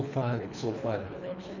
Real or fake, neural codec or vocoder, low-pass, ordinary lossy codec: fake; codec, 24 kHz, 3 kbps, HILCodec; 7.2 kHz; none